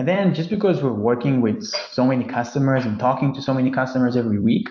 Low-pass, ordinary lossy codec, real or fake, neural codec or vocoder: 7.2 kHz; MP3, 48 kbps; fake; vocoder, 44.1 kHz, 128 mel bands every 256 samples, BigVGAN v2